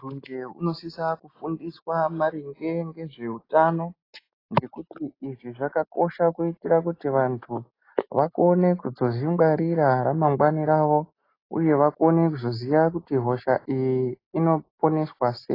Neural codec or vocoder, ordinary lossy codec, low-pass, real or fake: none; AAC, 24 kbps; 5.4 kHz; real